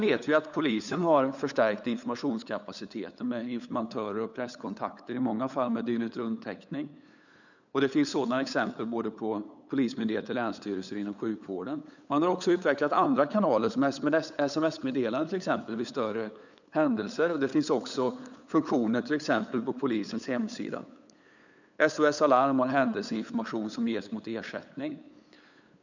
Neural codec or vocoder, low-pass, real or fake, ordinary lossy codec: codec, 16 kHz, 8 kbps, FunCodec, trained on LibriTTS, 25 frames a second; 7.2 kHz; fake; none